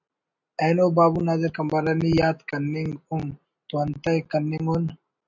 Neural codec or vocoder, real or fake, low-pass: none; real; 7.2 kHz